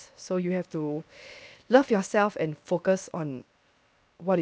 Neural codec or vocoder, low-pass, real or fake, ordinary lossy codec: codec, 16 kHz, 0.7 kbps, FocalCodec; none; fake; none